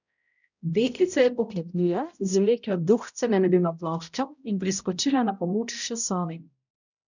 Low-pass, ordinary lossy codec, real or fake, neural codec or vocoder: 7.2 kHz; none; fake; codec, 16 kHz, 0.5 kbps, X-Codec, HuBERT features, trained on balanced general audio